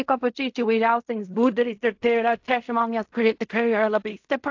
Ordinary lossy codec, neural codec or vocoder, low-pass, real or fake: AAC, 48 kbps; codec, 16 kHz in and 24 kHz out, 0.4 kbps, LongCat-Audio-Codec, fine tuned four codebook decoder; 7.2 kHz; fake